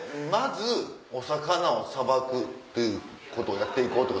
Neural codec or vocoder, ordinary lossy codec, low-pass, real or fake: none; none; none; real